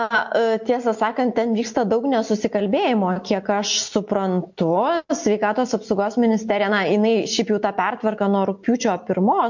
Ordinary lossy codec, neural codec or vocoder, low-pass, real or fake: MP3, 48 kbps; none; 7.2 kHz; real